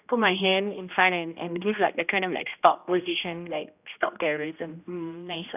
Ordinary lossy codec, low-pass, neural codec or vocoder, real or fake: none; 3.6 kHz; codec, 16 kHz, 1 kbps, X-Codec, HuBERT features, trained on general audio; fake